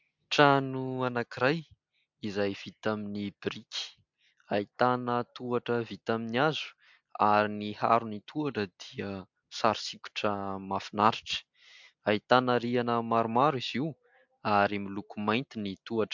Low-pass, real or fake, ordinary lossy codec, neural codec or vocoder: 7.2 kHz; real; MP3, 64 kbps; none